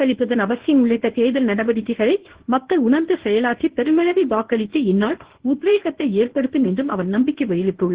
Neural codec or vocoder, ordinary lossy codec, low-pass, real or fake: codec, 24 kHz, 0.9 kbps, WavTokenizer, medium speech release version 1; Opus, 16 kbps; 3.6 kHz; fake